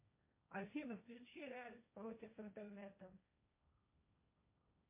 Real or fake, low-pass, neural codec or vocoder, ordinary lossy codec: fake; 3.6 kHz; codec, 16 kHz, 1.1 kbps, Voila-Tokenizer; MP3, 24 kbps